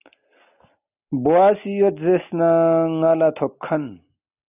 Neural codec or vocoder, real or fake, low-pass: none; real; 3.6 kHz